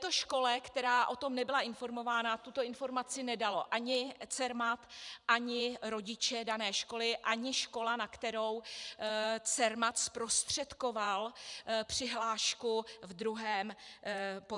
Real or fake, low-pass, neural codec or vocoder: fake; 10.8 kHz; vocoder, 44.1 kHz, 128 mel bands every 512 samples, BigVGAN v2